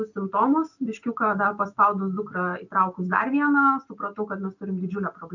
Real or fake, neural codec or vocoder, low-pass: real; none; 7.2 kHz